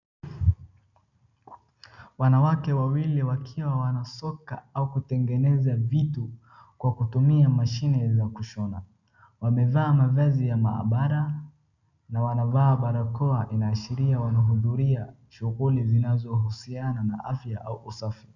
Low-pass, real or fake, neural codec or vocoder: 7.2 kHz; real; none